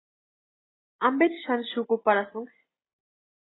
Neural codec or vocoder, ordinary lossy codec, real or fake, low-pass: none; AAC, 16 kbps; real; 7.2 kHz